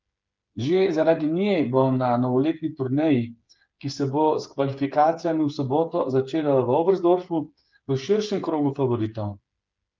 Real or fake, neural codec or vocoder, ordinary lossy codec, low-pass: fake; codec, 16 kHz, 8 kbps, FreqCodec, smaller model; Opus, 24 kbps; 7.2 kHz